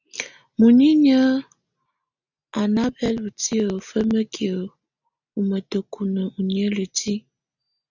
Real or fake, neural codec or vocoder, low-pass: real; none; 7.2 kHz